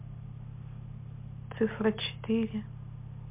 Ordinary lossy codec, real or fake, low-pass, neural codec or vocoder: MP3, 32 kbps; real; 3.6 kHz; none